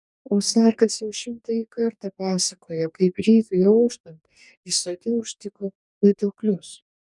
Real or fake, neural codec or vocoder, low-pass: fake; codec, 44.1 kHz, 2.6 kbps, DAC; 10.8 kHz